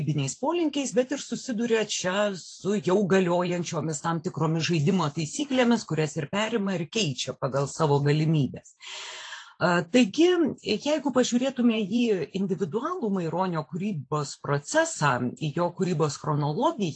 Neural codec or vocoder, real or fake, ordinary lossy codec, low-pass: vocoder, 44.1 kHz, 128 mel bands every 512 samples, BigVGAN v2; fake; AAC, 48 kbps; 9.9 kHz